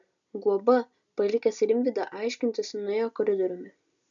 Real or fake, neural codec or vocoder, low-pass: real; none; 7.2 kHz